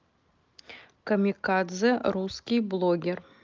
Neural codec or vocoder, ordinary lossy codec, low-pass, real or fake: none; Opus, 24 kbps; 7.2 kHz; real